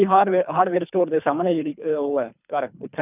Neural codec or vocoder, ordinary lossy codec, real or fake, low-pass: codec, 24 kHz, 3 kbps, HILCodec; none; fake; 3.6 kHz